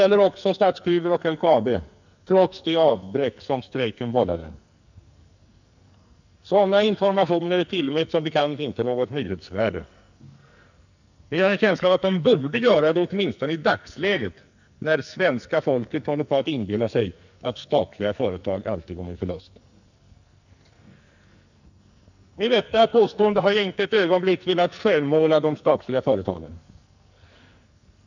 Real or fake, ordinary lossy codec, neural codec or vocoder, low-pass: fake; none; codec, 44.1 kHz, 2.6 kbps, SNAC; 7.2 kHz